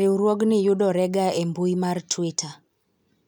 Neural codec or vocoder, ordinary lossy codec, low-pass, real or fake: none; none; 19.8 kHz; real